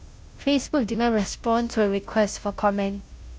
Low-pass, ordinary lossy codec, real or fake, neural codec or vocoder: none; none; fake; codec, 16 kHz, 0.5 kbps, FunCodec, trained on Chinese and English, 25 frames a second